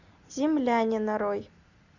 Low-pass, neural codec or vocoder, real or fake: 7.2 kHz; none; real